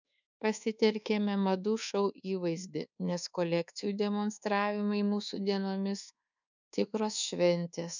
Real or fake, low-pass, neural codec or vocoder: fake; 7.2 kHz; codec, 24 kHz, 1.2 kbps, DualCodec